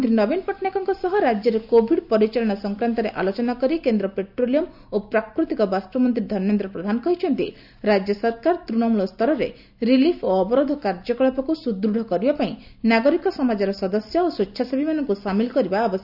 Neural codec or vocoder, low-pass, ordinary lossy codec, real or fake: none; 5.4 kHz; none; real